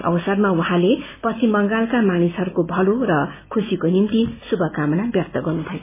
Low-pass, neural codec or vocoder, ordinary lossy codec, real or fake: 3.6 kHz; none; MP3, 16 kbps; real